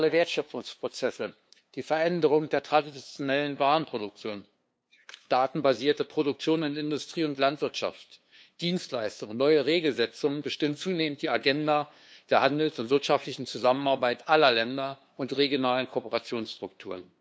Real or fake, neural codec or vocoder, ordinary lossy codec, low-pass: fake; codec, 16 kHz, 2 kbps, FunCodec, trained on LibriTTS, 25 frames a second; none; none